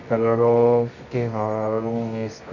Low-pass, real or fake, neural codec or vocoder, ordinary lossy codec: 7.2 kHz; fake; codec, 24 kHz, 0.9 kbps, WavTokenizer, medium music audio release; none